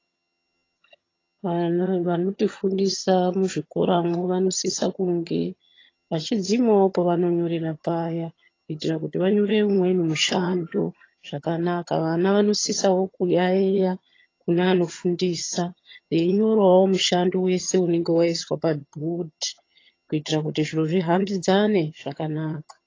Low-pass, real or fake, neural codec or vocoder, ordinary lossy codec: 7.2 kHz; fake; vocoder, 22.05 kHz, 80 mel bands, HiFi-GAN; AAC, 32 kbps